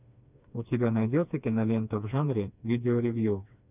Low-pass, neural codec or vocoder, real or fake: 3.6 kHz; codec, 16 kHz, 2 kbps, FreqCodec, smaller model; fake